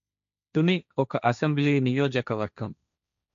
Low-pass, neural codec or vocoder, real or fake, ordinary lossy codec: 7.2 kHz; codec, 16 kHz, 1.1 kbps, Voila-Tokenizer; fake; none